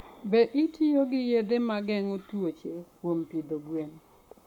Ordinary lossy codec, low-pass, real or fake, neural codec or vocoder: none; 19.8 kHz; fake; codec, 44.1 kHz, 7.8 kbps, Pupu-Codec